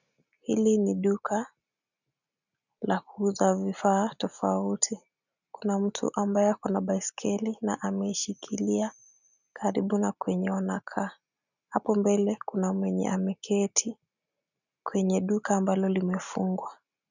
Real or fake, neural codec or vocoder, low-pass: real; none; 7.2 kHz